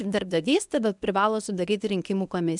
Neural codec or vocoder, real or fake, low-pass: codec, 24 kHz, 0.9 kbps, WavTokenizer, medium speech release version 1; fake; 10.8 kHz